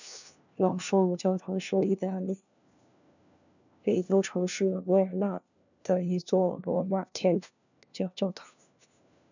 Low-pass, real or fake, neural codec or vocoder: 7.2 kHz; fake; codec, 16 kHz, 1 kbps, FunCodec, trained on LibriTTS, 50 frames a second